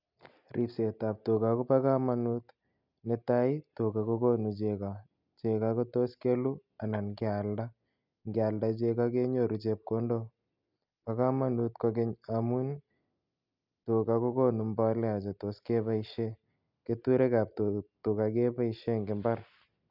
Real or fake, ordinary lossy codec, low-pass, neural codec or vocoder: real; none; 5.4 kHz; none